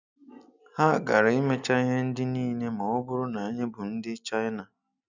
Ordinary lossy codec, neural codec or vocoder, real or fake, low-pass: none; none; real; 7.2 kHz